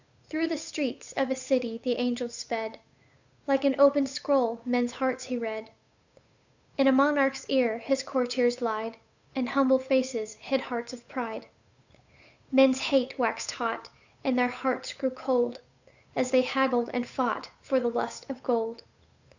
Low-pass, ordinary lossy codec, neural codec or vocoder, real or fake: 7.2 kHz; Opus, 64 kbps; codec, 16 kHz, 8 kbps, FunCodec, trained on Chinese and English, 25 frames a second; fake